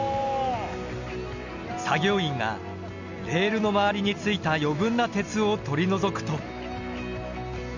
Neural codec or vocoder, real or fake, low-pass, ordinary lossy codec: none; real; 7.2 kHz; none